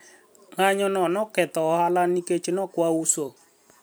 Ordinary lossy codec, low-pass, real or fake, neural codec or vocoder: none; none; fake; vocoder, 44.1 kHz, 128 mel bands every 256 samples, BigVGAN v2